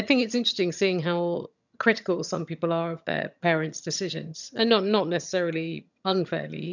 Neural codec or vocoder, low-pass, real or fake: vocoder, 22.05 kHz, 80 mel bands, HiFi-GAN; 7.2 kHz; fake